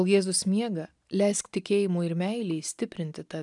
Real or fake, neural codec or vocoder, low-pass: real; none; 10.8 kHz